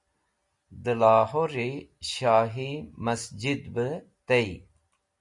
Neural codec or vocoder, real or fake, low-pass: none; real; 10.8 kHz